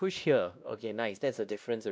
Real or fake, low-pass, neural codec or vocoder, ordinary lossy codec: fake; none; codec, 16 kHz, 1 kbps, X-Codec, WavLM features, trained on Multilingual LibriSpeech; none